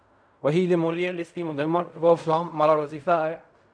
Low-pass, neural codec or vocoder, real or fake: 9.9 kHz; codec, 16 kHz in and 24 kHz out, 0.4 kbps, LongCat-Audio-Codec, fine tuned four codebook decoder; fake